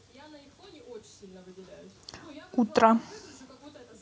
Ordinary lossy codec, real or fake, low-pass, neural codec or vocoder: none; real; none; none